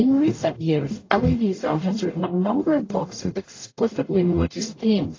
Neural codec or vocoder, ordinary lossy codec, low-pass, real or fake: codec, 44.1 kHz, 0.9 kbps, DAC; AAC, 32 kbps; 7.2 kHz; fake